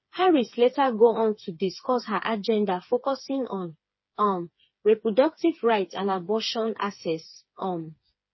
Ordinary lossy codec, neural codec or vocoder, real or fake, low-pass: MP3, 24 kbps; codec, 16 kHz, 4 kbps, FreqCodec, smaller model; fake; 7.2 kHz